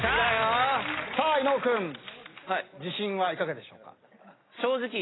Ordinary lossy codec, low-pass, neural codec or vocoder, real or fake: AAC, 16 kbps; 7.2 kHz; none; real